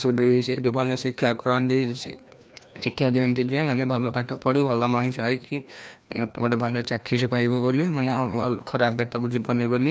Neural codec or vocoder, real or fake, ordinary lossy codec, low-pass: codec, 16 kHz, 1 kbps, FreqCodec, larger model; fake; none; none